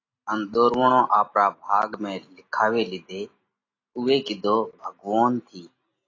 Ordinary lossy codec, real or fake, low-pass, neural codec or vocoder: AAC, 32 kbps; real; 7.2 kHz; none